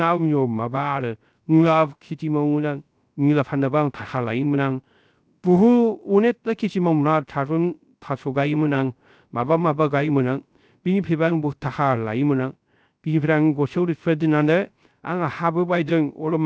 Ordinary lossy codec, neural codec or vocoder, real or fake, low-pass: none; codec, 16 kHz, 0.3 kbps, FocalCodec; fake; none